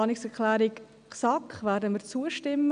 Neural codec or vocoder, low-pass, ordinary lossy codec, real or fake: none; 9.9 kHz; none; real